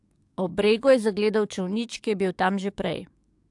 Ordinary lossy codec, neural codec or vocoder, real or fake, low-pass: AAC, 64 kbps; codec, 44.1 kHz, 7.8 kbps, DAC; fake; 10.8 kHz